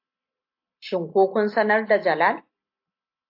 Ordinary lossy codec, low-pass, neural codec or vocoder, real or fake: AAC, 32 kbps; 5.4 kHz; none; real